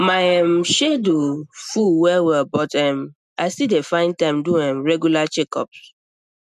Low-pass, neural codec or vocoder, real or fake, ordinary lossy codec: 14.4 kHz; none; real; none